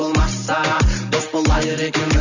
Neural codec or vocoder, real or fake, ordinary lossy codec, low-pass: none; real; none; 7.2 kHz